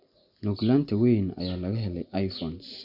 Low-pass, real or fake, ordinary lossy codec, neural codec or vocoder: 5.4 kHz; real; none; none